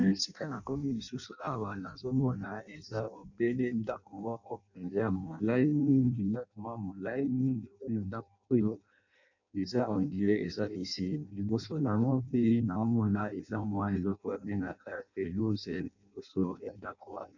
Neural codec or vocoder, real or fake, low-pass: codec, 16 kHz in and 24 kHz out, 0.6 kbps, FireRedTTS-2 codec; fake; 7.2 kHz